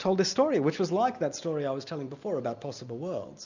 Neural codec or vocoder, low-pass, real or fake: none; 7.2 kHz; real